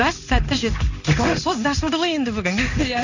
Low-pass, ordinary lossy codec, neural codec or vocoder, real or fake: 7.2 kHz; none; codec, 16 kHz in and 24 kHz out, 1 kbps, XY-Tokenizer; fake